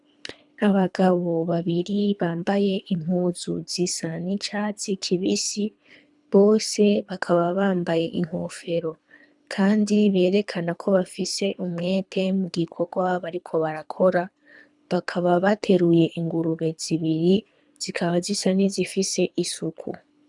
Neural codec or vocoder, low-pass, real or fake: codec, 24 kHz, 3 kbps, HILCodec; 10.8 kHz; fake